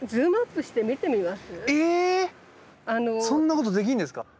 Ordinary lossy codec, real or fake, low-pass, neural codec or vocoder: none; real; none; none